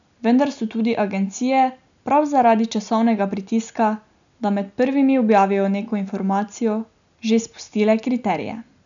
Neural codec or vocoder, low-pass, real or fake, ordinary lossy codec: none; 7.2 kHz; real; none